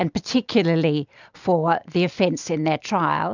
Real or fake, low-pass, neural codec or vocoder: real; 7.2 kHz; none